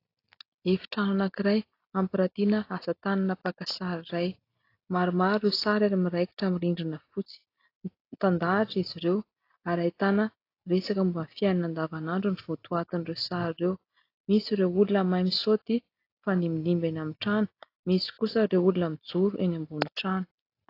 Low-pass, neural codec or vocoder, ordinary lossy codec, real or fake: 5.4 kHz; none; AAC, 32 kbps; real